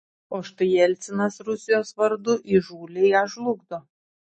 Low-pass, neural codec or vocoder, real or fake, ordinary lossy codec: 9.9 kHz; none; real; MP3, 32 kbps